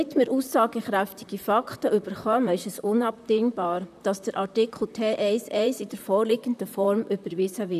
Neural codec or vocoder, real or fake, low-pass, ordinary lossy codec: vocoder, 44.1 kHz, 128 mel bands, Pupu-Vocoder; fake; 14.4 kHz; none